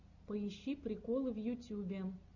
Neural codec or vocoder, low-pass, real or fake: none; 7.2 kHz; real